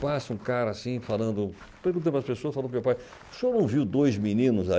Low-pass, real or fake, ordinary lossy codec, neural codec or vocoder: none; real; none; none